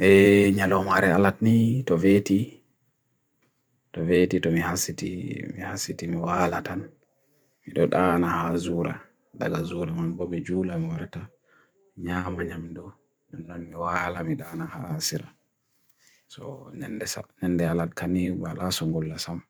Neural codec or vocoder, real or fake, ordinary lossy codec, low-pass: vocoder, 44.1 kHz, 128 mel bands every 512 samples, BigVGAN v2; fake; none; none